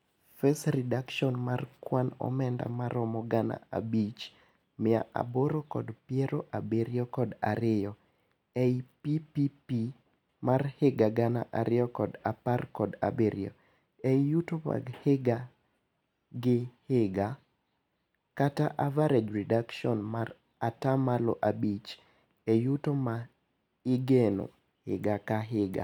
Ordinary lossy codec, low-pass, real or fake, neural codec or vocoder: none; 19.8 kHz; real; none